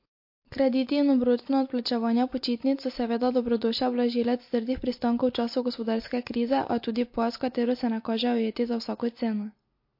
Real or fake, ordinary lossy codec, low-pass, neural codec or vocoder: real; MP3, 32 kbps; 5.4 kHz; none